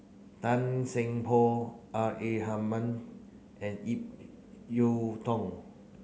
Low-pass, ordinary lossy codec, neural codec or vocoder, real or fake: none; none; none; real